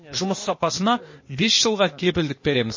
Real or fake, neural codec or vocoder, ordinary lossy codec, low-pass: fake; codec, 16 kHz, 0.8 kbps, ZipCodec; MP3, 32 kbps; 7.2 kHz